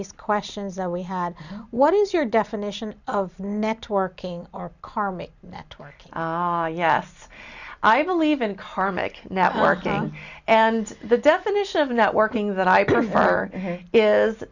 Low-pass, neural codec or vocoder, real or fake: 7.2 kHz; none; real